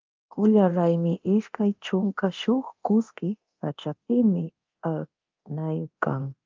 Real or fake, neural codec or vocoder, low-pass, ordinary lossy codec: fake; codec, 24 kHz, 0.9 kbps, DualCodec; 7.2 kHz; Opus, 24 kbps